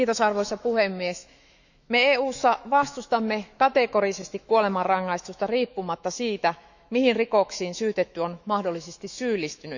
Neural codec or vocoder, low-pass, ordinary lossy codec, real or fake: autoencoder, 48 kHz, 128 numbers a frame, DAC-VAE, trained on Japanese speech; 7.2 kHz; none; fake